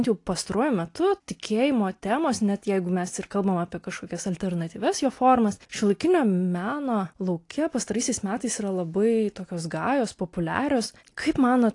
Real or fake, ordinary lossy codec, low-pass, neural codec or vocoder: real; AAC, 48 kbps; 10.8 kHz; none